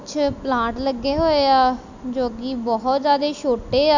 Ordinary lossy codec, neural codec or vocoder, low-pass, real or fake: none; none; 7.2 kHz; real